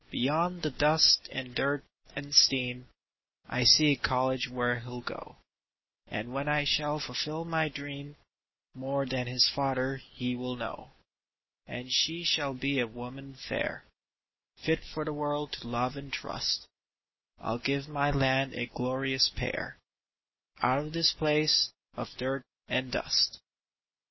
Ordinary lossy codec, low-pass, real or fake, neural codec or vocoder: MP3, 24 kbps; 7.2 kHz; real; none